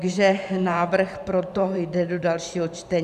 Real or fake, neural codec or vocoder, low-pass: fake; vocoder, 44.1 kHz, 128 mel bands every 512 samples, BigVGAN v2; 14.4 kHz